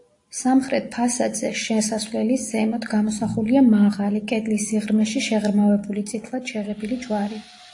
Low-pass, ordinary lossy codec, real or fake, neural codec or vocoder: 10.8 kHz; AAC, 48 kbps; real; none